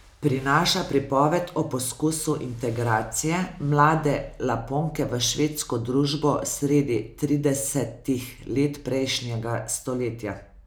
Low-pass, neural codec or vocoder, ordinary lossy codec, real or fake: none; none; none; real